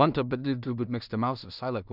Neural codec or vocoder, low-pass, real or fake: codec, 16 kHz in and 24 kHz out, 0.4 kbps, LongCat-Audio-Codec, two codebook decoder; 5.4 kHz; fake